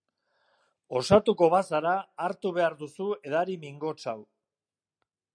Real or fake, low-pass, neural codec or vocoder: real; 9.9 kHz; none